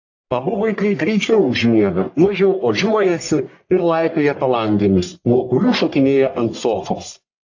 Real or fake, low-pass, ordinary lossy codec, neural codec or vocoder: fake; 7.2 kHz; AAC, 48 kbps; codec, 44.1 kHz, 1.7 kbps, Pupu-Codec